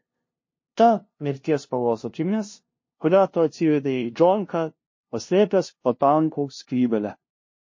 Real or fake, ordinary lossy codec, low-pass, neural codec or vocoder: fake; MP3, 32 kbps; 7.2 kHz; codec, 16 kHz, 0.5 kbps, FunCodec, trained on LibriTTS, 25 frames a second